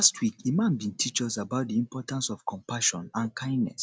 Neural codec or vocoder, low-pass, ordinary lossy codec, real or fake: none; none; none; real